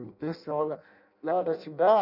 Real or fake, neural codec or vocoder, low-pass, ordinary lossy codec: fake; codec, 16 kHz in and 24 kHz out, 0.6 kbps, FireRedTTS-2 codec; 5.4 kHz; none